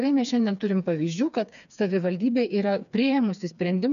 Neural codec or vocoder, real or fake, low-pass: codec, 16 kHz, 4 kbps, FreqCodec, smaller model; fake; 7.2 kHz